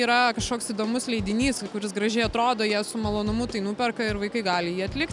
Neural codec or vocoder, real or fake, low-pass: none; real; 10.8 kHz